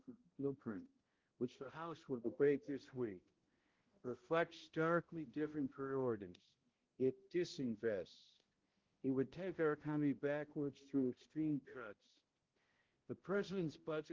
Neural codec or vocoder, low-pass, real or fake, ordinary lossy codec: codec, 16 kHz, 0.5 kbps, X-Codec, HuBERT features, trained on balanced general audio; 7.2 kHz; fake; Opus, 16 kbps